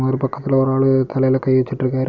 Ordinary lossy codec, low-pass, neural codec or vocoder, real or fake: none; 7.2 kHz; none; real